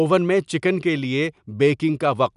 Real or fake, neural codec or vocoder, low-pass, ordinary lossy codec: real; none; 10.8 kHz; none